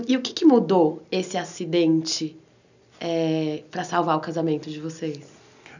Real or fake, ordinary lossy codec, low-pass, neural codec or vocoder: real; none; 7.2 kHz; none